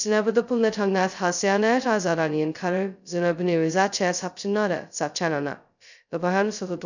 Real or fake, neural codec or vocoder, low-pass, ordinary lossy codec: fake; codec, 16 kHz, 0.2 kbps, FocalCodec; 7.2 kHz; none